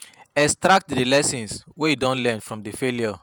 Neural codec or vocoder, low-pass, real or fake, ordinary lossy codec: vocoder, 48 kHz, 128 mel bands, Vocos; none; fake; none